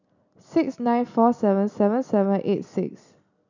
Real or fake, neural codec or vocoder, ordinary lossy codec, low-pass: real; none; none; 7.2 kHz